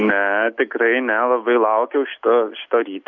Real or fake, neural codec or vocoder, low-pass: real; none; 7.2 kHz